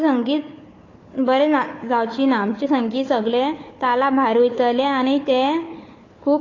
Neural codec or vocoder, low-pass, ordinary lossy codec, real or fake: codec, 16 kHz, 4 kbps, FunCodec, trained on Chinese and English, 50 frames a second; 7.2 kHz; AAC, 32 kbps; fake